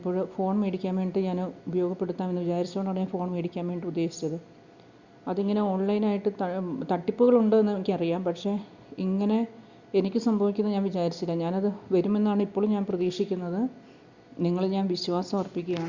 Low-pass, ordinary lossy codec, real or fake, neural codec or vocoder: 7.2 kHz; Opus, 64 kbps; real; none